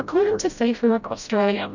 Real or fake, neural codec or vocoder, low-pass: fake; codec, 16 kHz, 0.5 kbps, FreqCodec, smaller model; 7.2 kHz